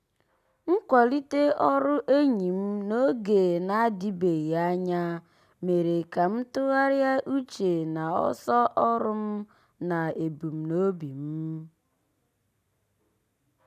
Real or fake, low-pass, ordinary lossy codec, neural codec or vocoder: real; 14.4 kHz; none; none